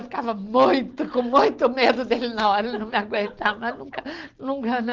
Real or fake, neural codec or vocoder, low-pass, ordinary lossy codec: real; none; 7.2 kHz; Opus, 16 kbps